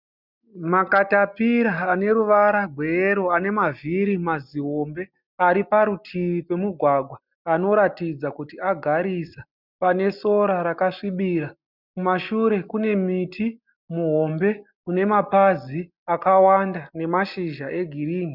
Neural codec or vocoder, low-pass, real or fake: none; 5.4 kHz; real